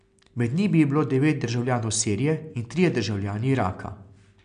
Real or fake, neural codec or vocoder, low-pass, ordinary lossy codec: real; none; 9.9 kHz; MP3, 64 kbps